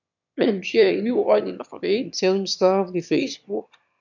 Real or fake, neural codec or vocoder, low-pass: fake; autoencoder, 22.05 kHz, a latent of 192 numbers a frame, VITS, trained on one speaker; 7.2 kHz